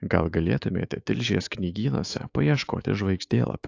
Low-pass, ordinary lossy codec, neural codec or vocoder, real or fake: 7.2 kHz; AAC, 48 kbps; autoencoder, 48 kHz, 128 numbers a frame, DAC-VAE, trained on Japanese speech; fake